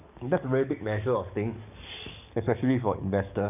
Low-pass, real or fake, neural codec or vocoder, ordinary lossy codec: 3.6 kHz; fake; codec, 44.1 kHz, 7.8 kbps, DAC; none